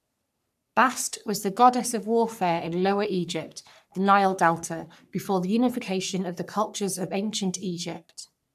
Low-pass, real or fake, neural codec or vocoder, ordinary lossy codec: 14.4 kHz; fake; codec, 44.1 kHz, 3.4 kbps, Pupu-Codec; none